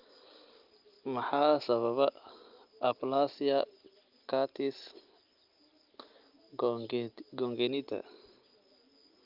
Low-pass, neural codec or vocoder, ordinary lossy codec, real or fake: 5.4 kHz; none; Opus, 24 kbps; real